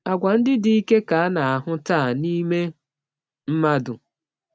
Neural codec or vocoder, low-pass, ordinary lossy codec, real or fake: none; none; none; real